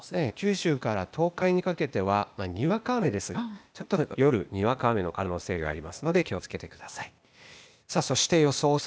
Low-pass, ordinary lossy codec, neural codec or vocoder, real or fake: none; none; codec, 16 kHz, 0.8 kbps, ZipCodec; fake